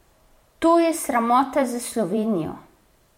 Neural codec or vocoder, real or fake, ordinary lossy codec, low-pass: vocoder, 44.1 kHz, 128 mel bands every 512 samples, BigVGAN v2; fake; MP3, 64 kbps; 19.8 kHz